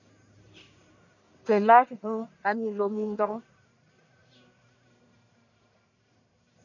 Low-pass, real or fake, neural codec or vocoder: 7.2 kHz; fake; codec, 44.1 kHz, 1.7 kbps, Pupu-Codec